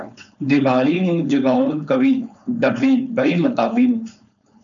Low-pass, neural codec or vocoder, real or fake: 7.2 kHz; codec, 16 kHz, 4.8 kbps, FACodec; fake